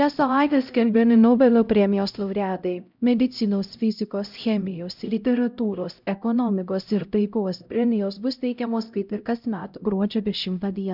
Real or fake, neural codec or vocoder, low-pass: fake; codec, 16 kHz, 0.5 kbps, X-Codec, HuBERT features, trained on LibriSpeech; 5.4 kHz